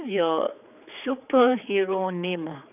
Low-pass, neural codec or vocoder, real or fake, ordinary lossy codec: 3.6 kHz; codec, 16 kHz, 4 kbps, X-Codec, HuBERT features, trained on general audio; fake; none